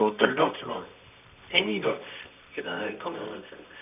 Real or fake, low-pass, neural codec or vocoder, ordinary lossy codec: fake; 3.6 kHz; codec, 24 kHz, 0.9 kbps, WavTokenizer, medium music audio release; none